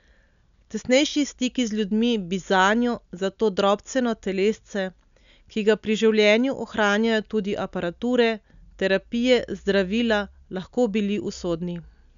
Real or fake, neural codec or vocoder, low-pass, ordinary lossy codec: real; none; 7.2 kHz; none